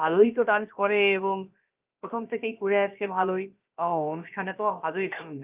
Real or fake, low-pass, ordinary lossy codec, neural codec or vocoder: fake; 3.6 kHz; Opus, 32 kbps; codec, 16 kHz, about 1 kbps, DyCAST, with the encoder's durations